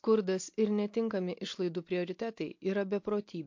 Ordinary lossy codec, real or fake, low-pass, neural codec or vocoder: MP3, 48 kbps; real; 7.2 kHz; none